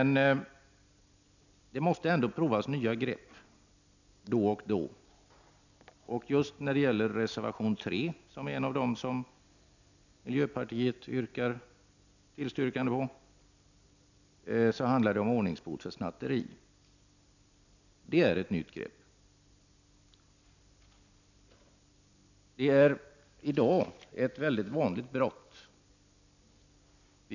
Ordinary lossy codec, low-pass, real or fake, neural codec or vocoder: none; 7.2 kHz; real; none